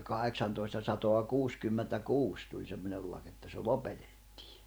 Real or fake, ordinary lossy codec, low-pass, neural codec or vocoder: fake; none; none; vocoder, 44.1 kHz, 128 mel bands every 512 samples, BigVGAN v2